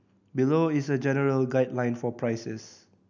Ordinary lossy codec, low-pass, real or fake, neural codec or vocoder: none; 7.2 kHz; real; none